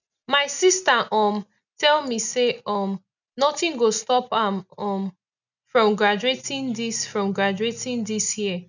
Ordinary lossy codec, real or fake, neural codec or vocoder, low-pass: none; real; none; 7.2 kHz